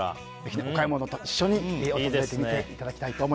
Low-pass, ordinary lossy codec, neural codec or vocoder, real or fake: none; none; none; real